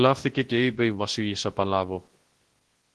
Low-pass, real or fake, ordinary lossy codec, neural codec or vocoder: 10.8 kHz; fake; Opus, 16 kbps; codec, 24 kHz, 0.9 kbps, WavTokenizer, large speech release